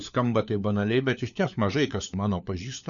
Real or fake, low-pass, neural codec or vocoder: fake; 7.2 kHz; codec, 16 kHz, 4 kbps, FunCodec, trained on LibriTTS, 50 frames a second